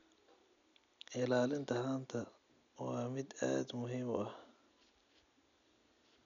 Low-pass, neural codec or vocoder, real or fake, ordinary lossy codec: 7.2 kHz; none; real; none